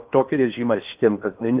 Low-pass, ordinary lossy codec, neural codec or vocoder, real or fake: 3.6 kHz; Opus, 32 kbps; codec, 16 kHz in and 24 kHz out, 0.8 kbps, FocalCodec, streaming, 65536 codes; fake